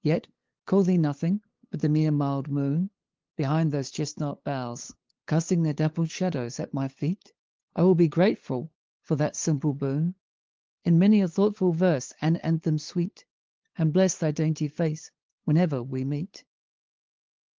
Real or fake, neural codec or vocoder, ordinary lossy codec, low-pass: fake; codec, 16 kHz, 8 kbps, FunCodec, trained on LibriTTS, 25 frames a second; Opus, 16 kbps; 7.2 kHz